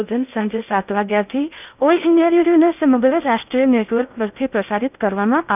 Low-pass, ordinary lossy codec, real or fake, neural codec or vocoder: 3.6 kHz; none; fake; codec, 16 kHz in and 24 kHz out, 0.6 kbps, FocalCodec, streaming, 2048 codes